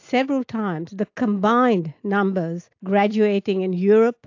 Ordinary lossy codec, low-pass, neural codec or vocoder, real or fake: AAC, 48 kbps; 7.2 kHz; none; real